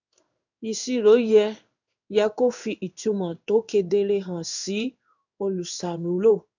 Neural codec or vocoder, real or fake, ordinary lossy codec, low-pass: codec, 16 kHz in and 24 kHz out, 1 kbps, XY-Tokenizer; fake; none; 7.2 kHz